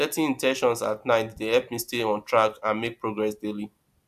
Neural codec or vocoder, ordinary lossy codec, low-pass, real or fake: none; AAC, 96 kbps; 14.4 kHz; real